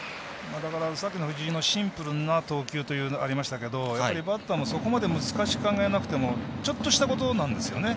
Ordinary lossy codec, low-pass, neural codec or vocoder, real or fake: none; none; none; real